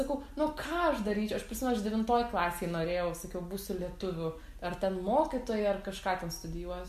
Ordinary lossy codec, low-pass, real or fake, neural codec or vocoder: MP3, 64 kbps; 14.4 kHz; real; none